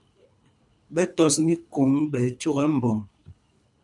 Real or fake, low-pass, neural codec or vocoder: fake; 10.8 kHz; codec, 24 kHz, 3 kbps, HILCodec